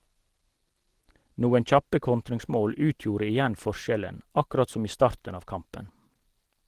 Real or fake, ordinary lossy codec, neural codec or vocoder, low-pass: real; Opus, 16 kbps; none; 14.4 kHz